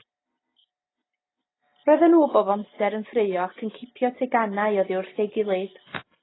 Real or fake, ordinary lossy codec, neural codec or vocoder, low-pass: real; AAC, 16 kbps; none; 7.2 kHz